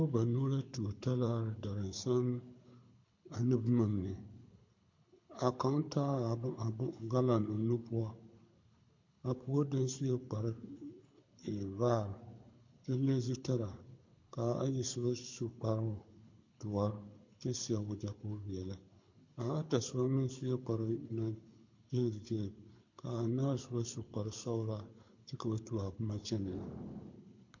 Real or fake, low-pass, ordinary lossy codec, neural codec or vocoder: fake; 7.2 kHz; MP3, 48 kbps; codec, 24 kHz, 6 kbps, HILCodec